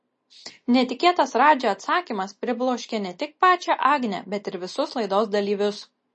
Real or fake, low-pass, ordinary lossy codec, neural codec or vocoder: real; 9.9 kHz; MP3, 32 kbps; none